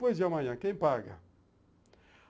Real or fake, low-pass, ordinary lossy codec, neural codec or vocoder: real; none; none; none